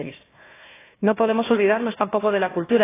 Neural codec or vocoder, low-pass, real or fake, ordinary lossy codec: codec, 16 kHz, 1 kbps, FunCodec, trained on Chinese and English, 50 frames a second; 3.6 kHz; fake; AAC, 16 kbps